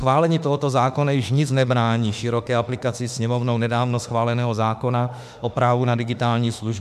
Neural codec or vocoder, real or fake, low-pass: autoencoder, 48 kHz, 32 numbers a frame, DAC-VAE, trained on Japanese speech; fake; 14.4 kHz